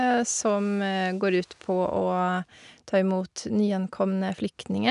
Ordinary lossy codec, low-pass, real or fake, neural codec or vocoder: none; 10.8 kHz; real; none